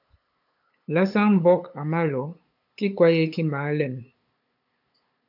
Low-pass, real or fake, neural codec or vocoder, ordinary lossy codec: 5.4 kHz; fake; codec, 16 kHz, 8 kbps, FunCodec, trained on LibriTTS, 25 frames a second; AAC, 48 kbps